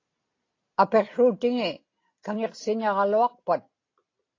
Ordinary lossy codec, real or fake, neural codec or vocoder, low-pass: AAC, 32 kbps; real; none; 7.2 kHz